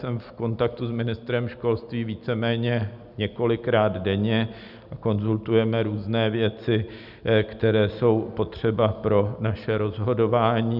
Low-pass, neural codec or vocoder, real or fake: 5.4 kHz; none; real